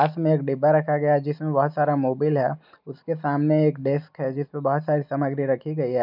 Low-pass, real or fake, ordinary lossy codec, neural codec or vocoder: 5.4 kHz; real; none; none